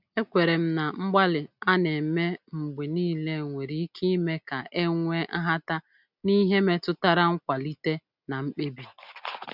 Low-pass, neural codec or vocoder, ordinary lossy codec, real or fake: 5.4 kHz; none; none; real